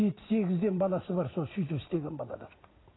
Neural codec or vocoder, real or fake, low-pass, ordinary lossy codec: none; real; 7.2 kHz; AAC, 16 kbps